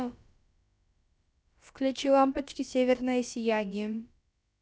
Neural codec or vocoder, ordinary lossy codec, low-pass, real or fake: codec, 16 kHz, about 1 kbps, DyCAST, with the encoder's durations; none; none; fake